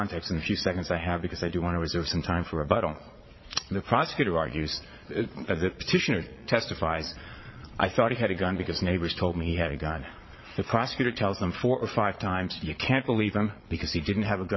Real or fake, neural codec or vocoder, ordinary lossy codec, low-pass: fake; vocoder, 22.05 kHz, 80 mel bands, Vocos; MP3, 24 kbps; 7.2 kHz